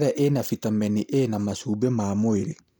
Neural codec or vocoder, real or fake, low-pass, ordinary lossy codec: none; real; none; none